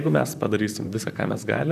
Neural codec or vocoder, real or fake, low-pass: vocoder, 44.1 kHz, 128 mel bands, Pupu-Vocoder; fake; 14.4 kHz